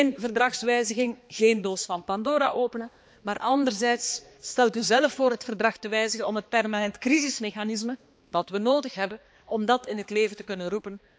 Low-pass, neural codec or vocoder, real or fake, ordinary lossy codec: none; codec, 16 kHz, 2 kbps, X-Codec, HuBERT features, trained on balanced general audio; fake; none